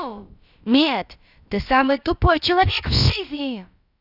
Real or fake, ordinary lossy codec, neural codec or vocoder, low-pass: fake; none; codec, 16 kHz, about 1 kbps, DyCAST, with the encoder's durations; 5.4 kHz